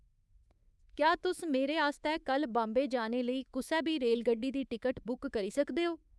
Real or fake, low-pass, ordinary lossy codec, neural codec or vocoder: fake; none; none; codec, 24 kHz, 3.1 kbps, DualCodec